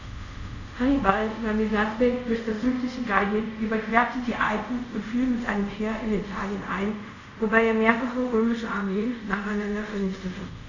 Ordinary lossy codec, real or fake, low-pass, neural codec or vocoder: none; fake; 7.2 kHz; codec, 24 kHz, 0.5 kbps, DualCodec